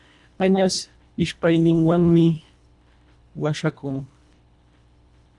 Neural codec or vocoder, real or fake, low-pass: codec, 24 kHz, 1.5 kbps, HILCodec; fake; 10.8 kHz